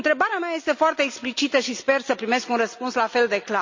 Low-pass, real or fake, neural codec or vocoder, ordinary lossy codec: 7.2 kHz; real; none; MP3, 48 kbps